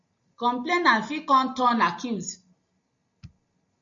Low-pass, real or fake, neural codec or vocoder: 7.2 kHz; real; none